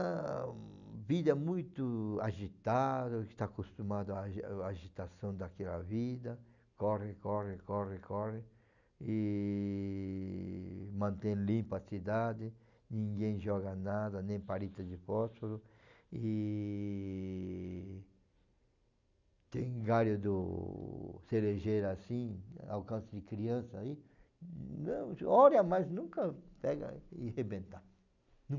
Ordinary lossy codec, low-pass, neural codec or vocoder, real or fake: none; 7.2 kHz; none; real